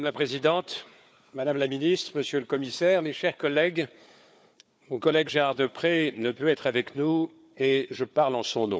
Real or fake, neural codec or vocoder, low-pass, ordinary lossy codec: fake; codec, 16 kHz, 4 kbps, FunCodec, trained on Chinese and English, 50 frames a second; none; none